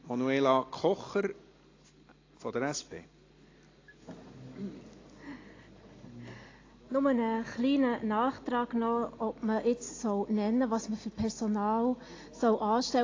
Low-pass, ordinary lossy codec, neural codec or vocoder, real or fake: 7.2 kHz; AAC, 32 kbps; none; real